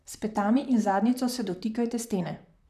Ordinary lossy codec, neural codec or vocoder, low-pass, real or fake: none; vocoder, 44.1 kHz, 128 mel bands, Pupu-Vocoder; 14.4 kHz; fake